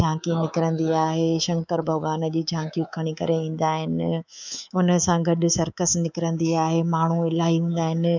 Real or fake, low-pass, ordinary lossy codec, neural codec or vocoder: fake; 7.2 kHz; none; codec, 24 kHz, 3.1 kbps, DualCodec